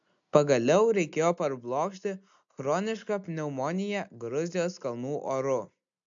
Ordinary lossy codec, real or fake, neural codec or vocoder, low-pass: AAC, 64 kbps; real; none; 7.2 kHz